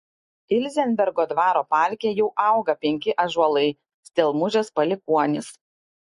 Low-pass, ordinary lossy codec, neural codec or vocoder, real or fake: 14.4 kHz; MP3, 48 kbps; none; real